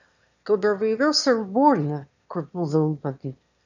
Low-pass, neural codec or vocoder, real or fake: 7.2 kHz; autoencoder, 22.05 kHz, a latent of 192 numbers a frame, VITS, trained on one speaker; fake